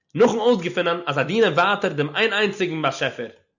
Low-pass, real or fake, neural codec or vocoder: 7.2 kHz; real; none